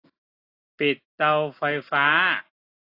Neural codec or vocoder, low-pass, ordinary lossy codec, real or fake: none; 5.4 kHz; AAC, 32 kbps; real